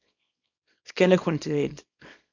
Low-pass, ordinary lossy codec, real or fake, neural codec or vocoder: 7.2 kHz; MP3, 64 kbps; fake; codec, 24 kHz, 0.9 kbps, WavTokenizer, small release